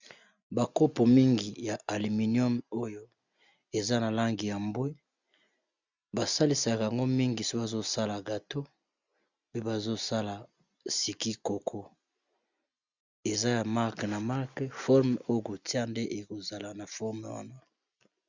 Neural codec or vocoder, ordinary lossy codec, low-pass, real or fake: none; Opus, 64 kbps; 7.2 kHz; real